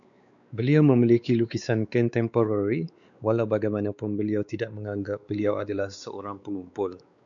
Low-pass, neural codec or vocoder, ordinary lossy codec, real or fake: 7.2 kHz; codec, 16 kHz, 4 kbps, X-Codec, WavLM features, trained on Multilingual LibriSpeech; MP3, 96 kbps; fake